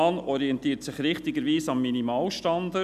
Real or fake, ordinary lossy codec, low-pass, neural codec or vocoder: real; none; 14.4 kHz; none